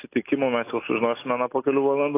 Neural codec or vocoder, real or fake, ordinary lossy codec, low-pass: none; real; AAC, 24 kbps; 3.6 kHz